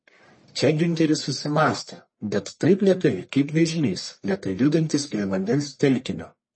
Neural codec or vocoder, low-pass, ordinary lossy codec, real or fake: codec, 44.1 kHz, 1.7 kbps, Pupu-Codec; 9.9 kHz; MP3, 32 kbps; fake